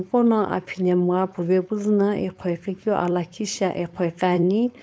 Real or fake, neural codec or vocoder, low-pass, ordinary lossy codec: fake; codec, 16 kHz, 4.8 kbps, FACodec; none; none